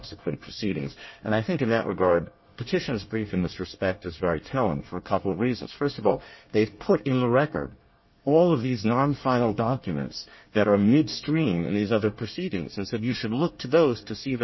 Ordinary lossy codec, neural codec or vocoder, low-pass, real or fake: MP3, 24 kbps; codec, 24 kHz, 1 kbps, SNAC; 7.2 kHz; fake